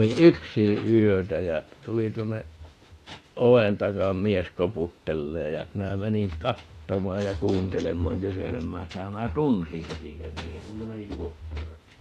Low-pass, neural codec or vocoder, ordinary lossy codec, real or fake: 14.4 kHz; autoencoder, 48 kHz, 32 numbers a frame, DAC-VAE, trained on Japanese speech; AAC, 48 kbps; fake